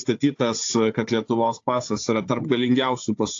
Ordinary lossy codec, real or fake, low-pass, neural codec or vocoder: AAC, 48 kbps; fake; 7.2 kHz; codec, 16 kHz, 4 kbps, FunCodec, trained on Chinese and English, 50 frames a second